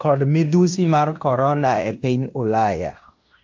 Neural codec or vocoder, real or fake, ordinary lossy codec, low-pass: codec, 16 kHz in and 24 kHz out, 0.9 kbps, LongCat-Audio-Codec, fine tuned four codebook decoder; fake; AAC, 48 kbps; 7.2 kHz